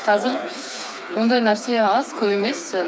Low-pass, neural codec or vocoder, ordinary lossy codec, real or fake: none; codec, 16 kHz, 4 kbps, FreqCodec, smaller model; none; fake